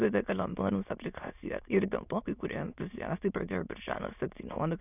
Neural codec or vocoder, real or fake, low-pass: autoencoder, 22.05 kHz, a latent of 192 numbers a frame, VITS, trained on many speakers; fake; 3.6 kHz